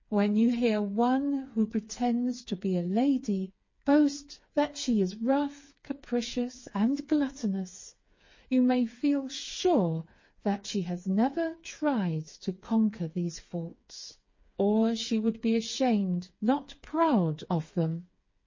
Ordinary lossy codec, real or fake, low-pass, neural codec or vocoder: MP3, 32 kbps; fake; 7.2 kHz; codec, 16 kHz, 4 kbps, FreqCodec, smaller model